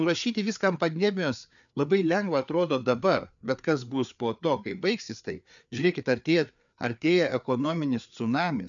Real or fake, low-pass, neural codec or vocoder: fake; 7.2 kHz; codec, 16 kHz, 4 kbps, FreqCodec, larger model